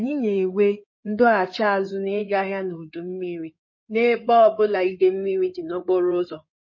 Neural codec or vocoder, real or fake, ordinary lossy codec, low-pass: codec, 16 kHz in and 24 kHz out, 2.2 kbps, FireRedTTS-2 codec; fake; MP3, 32 kbps; 7.2 kHz